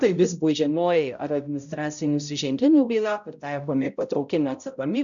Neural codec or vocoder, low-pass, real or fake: codec, 16 kHz, 0.5 kbps, X-Codec, HuBERT features, trained on balanced general audio; 7.2 kHz; fake